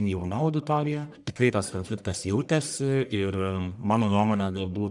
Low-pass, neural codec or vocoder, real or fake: 10.8 kHz; codec, 44.1 kHz, 1.7 kbps, Pupu-Codec; fake